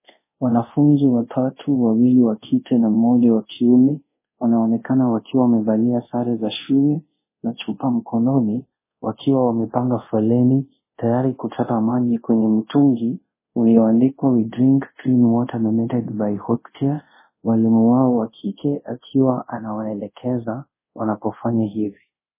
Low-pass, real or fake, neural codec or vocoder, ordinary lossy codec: 3.6 kHz; fake; codec, 24 kHz, 0.5 kbps, DualCodec; MP3, 16 kbps